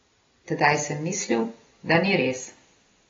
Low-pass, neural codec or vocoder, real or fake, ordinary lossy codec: 7.2 kHz; none; real; AAC, 24 kbps